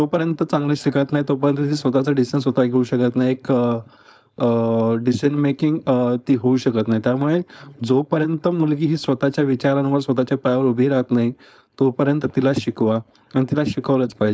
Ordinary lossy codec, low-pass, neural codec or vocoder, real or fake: none; none; codec, 16 kHz, 4.8 kbps, FACodec; fake